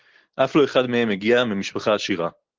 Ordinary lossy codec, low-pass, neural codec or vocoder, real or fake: Opus, 16 kbps; 7.2 kHz; none; real